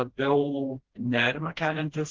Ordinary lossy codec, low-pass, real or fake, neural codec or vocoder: Opus, 16 kbps; 7.2 kHz; fake; codec, 16 kHz, 1 kbps, FreqCodec, smaller model